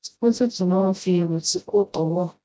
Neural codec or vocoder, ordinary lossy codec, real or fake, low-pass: codec, 16 kHz, 0.5 kbps, FreqCodec, smaller model; none; fake; none